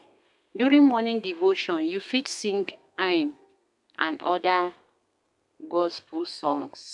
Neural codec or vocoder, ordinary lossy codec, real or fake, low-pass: codec, 32 kHz, 1.9 kbps, SNAC; none; fake; 10.8 kHz